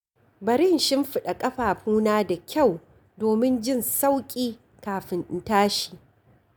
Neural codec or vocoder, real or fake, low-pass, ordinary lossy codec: none; real; none; none